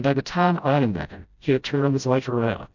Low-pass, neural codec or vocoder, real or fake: 7.2 kHz; codec, 16 kHz, 0.5 kbps, FreqCodec, smaller model; fake